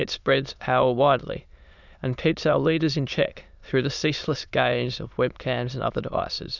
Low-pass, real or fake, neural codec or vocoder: 7.2 kHz; fake; autoencoder, 22.05 kHz, a latent of 192 numbers a frame, VITS, trained on many speakers